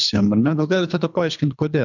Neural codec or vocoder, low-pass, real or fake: codec, 24 kHz, 3 kbps, HILCodec; 7.2 kHz; fake